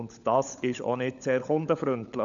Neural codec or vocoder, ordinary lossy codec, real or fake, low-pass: codec, 16 kHz, 16 kbps, FunCodec, trained on LibriTTS, 50 frames a second; none; fake; 7.2 kHz